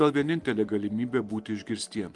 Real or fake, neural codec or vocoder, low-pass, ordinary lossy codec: real; none; 10.8 kHz; Opus, 24 kbps